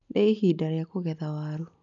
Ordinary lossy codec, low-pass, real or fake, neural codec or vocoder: none; 7.2 kHz; real; none